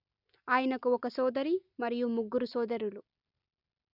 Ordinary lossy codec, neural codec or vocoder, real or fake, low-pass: none; none; real; 5.4 kHz